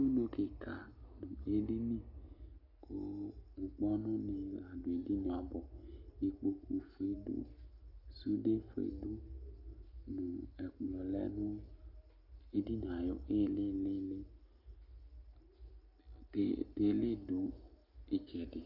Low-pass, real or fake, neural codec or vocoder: 5.4 kHz; real; none